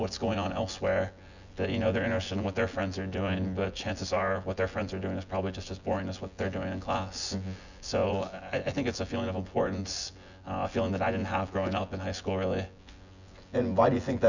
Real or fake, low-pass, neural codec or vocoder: fake; 7.2 kHz; vocoder, 24 kHz, 100 mel bands, Vocos